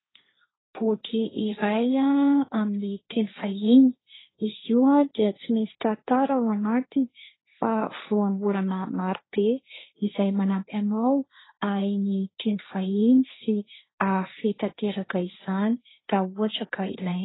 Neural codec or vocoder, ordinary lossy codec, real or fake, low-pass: codec, 16 kHz, 1.1 kbps, Voila-Tokenizer; AAC, 16 kbps; fake; 7.2 kHz